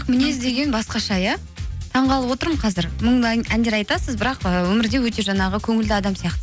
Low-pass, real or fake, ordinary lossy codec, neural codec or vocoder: none; real; none; none